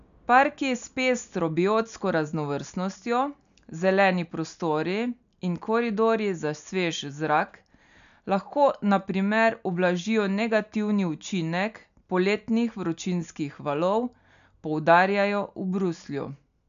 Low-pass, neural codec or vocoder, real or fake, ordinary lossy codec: 7.2 kHz; none; real; none